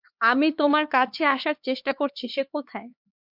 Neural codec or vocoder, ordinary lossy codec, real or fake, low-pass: codec, 16 kHz, 4 kbps, X-Codec, HuBERT features, trained on LibriSpeech; MP3, 48 kbps; fake; 5.4 kHz